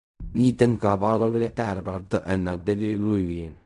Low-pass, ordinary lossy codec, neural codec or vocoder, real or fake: 10.8 kHz; none; codec, 16 kHz in and 24 kHz out, 0.4 kbps, LongCat-Audio-Codec, fine tuned four codebook decoder; fake